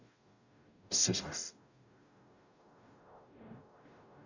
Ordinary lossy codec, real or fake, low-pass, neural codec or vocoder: none; fake; 7.2 kHz; codec, 44.1 kHz, 0.9 kbps, DAC